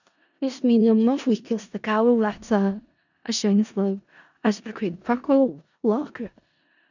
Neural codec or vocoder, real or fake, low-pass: codec, 16 kHz in and 24 kHz out, 0.4 kbps, LongCat-Audio-Codec, four codebook decoder; fake; 7.2 kHz